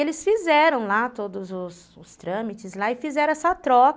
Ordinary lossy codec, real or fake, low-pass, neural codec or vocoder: none; real; none; none